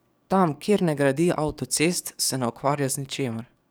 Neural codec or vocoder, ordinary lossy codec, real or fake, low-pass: codec, 44.1 kHz, 7.8 kbps, DAC; none; fake; none